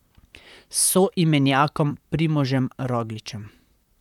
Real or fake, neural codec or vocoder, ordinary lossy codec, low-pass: fake; vocoder, 44.1 kHz, 128 mel bands, Pupu-Vocoder; none; 19.8 kHz